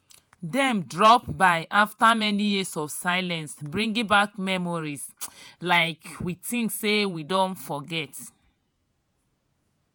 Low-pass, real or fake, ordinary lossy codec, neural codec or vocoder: none; fake; none; vocoder, 48 kHz, 128 mel bands, Vocos